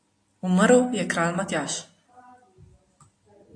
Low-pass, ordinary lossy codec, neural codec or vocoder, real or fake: 9.9 kHz; AAC, 48 kbps; none; real